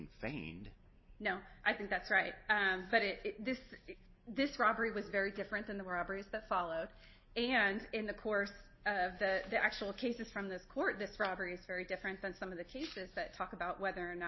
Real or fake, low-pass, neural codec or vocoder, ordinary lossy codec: real; 7.2 kHz; none; MP3, 24 kbps